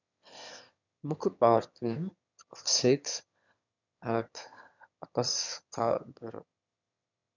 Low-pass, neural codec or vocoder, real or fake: 7.2 kHz; autoencoder, 22.05 kHz, a latent of 192 numbers a frame, VITS, trained on one speaker; fake